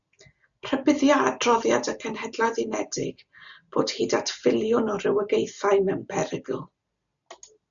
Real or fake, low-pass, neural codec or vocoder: real; 7.2 kHz; none